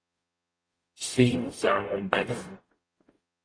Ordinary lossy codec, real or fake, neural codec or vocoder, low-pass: AAC, 32 kbps; fake; codec, 44.1 kHz, 0.9 kbps, DAC; 9.9 kHz